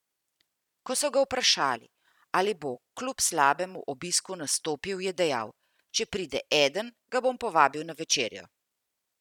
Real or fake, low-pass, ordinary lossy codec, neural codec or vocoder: fake; 19.8 kHz; none; vocoder, 44.1 kHz, 128 mel bands every 256 samples, BigVGAN v2